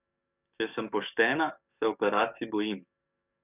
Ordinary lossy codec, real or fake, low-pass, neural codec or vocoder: none; fake; 3.6 kHz; codec, 44.1 kHz, 7.8 kbps, DAC